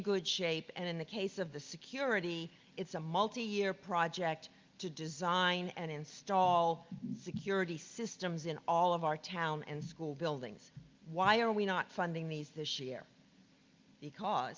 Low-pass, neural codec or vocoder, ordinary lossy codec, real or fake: 7.2 kHz; none; Opus, 24 kbps; real